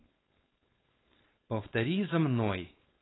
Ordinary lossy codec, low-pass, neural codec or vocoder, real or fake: AAC, 16 kbps; 7.2 kHz; codec, 16 kHz, 4.8 kbps, FACodec; fake